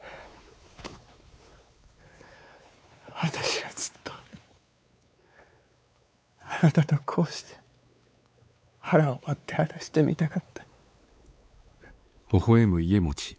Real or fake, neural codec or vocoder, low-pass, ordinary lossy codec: fake; codec, 16 kHz, 4 kbps, X-Codec, WavLM features, trained on Multilingual LibriSpeech; none; none